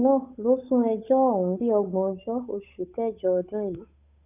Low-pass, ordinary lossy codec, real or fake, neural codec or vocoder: 3.6 kHz; none; fake; codec, 16 kHz, 8 kbps, FunCodec, trained on Chinese and English, 25 frames a second